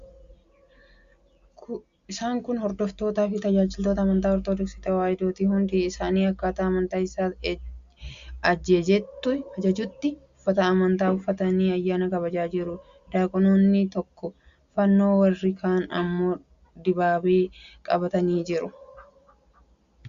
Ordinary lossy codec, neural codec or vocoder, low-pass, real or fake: Opus, 64 kbps; none; 7.2 kHz; real